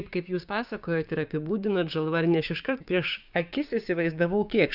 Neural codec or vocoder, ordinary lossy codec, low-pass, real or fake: codec, 16 kHz, 6 kbps, DAC; Opus, 64 kbps; 5.4 kHz; fake